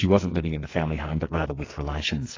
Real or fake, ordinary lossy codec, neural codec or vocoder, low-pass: fake; AAC, 32 kbps; codec, 44.1 kHz, 2.6 kbps, SNAC; 7.2 kHz